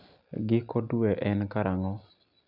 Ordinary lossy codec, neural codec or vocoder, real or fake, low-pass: none; none; real; 5.4 kHz